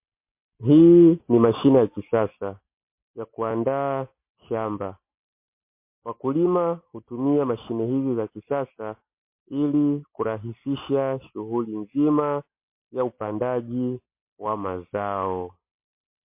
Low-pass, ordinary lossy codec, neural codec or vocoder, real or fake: 3.6 kHz; MP3, 24 kbps; none; real